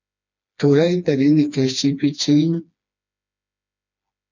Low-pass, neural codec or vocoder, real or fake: 7.2 kHz; codec, 16 kHz, 2 kbps, FreqCodec, smaller model; fake